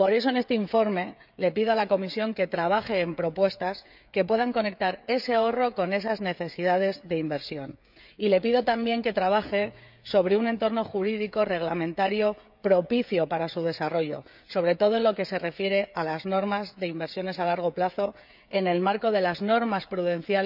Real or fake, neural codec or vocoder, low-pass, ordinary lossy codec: fake; codec, 16 kHz, 16 kbps, FreqCodec, smaller model; 5.4 kHz; none